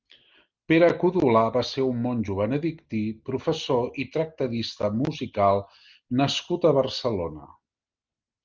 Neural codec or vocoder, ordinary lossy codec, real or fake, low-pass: none; Opus, 24 kbps; real; 7.2 kHz